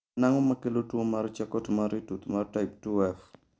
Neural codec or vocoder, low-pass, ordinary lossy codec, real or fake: none; none; none; real